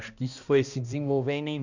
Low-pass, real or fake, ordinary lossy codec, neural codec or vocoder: 7.2 kHz; fake; none; codec, 16 kHz, 1 kbps, X-Codec, HuBERT features, trained on balanced general audio